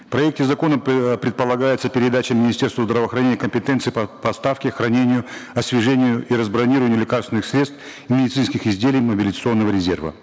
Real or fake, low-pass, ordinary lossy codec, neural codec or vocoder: real; none; none; none